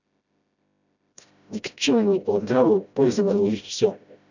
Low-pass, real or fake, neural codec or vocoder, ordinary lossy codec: 7.2 kHz; fake; codec, 16 kHz, 0.5 kbps, FreqCodec, smaller model; none